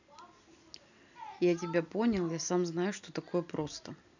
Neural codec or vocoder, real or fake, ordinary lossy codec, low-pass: none; real; none; 7.2 kHz